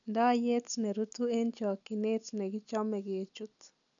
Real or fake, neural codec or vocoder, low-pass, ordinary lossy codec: real; none; 7.2 kHz; none